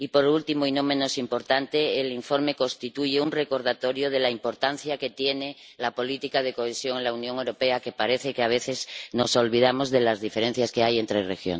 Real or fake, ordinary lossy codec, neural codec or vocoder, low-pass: real; none; none; none